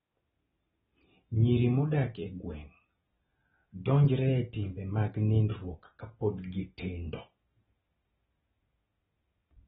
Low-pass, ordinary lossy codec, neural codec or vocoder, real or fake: 10.8 kHz; AAC, 16 kbps; none; real